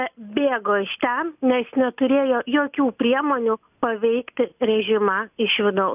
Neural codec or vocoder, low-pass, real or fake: none; 3.6 kHz; real